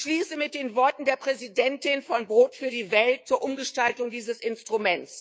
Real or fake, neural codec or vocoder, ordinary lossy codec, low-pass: fake; codec, 16 kHz, 6 kbps, DAC; none; none